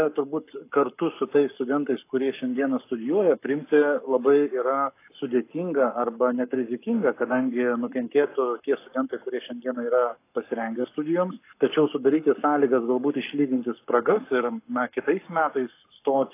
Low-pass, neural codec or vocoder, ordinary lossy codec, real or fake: 3.6 kHz; codec, 44.1 kHz, 7.8 kbps, Pupu-Codec; AAC, 24 kbps; fake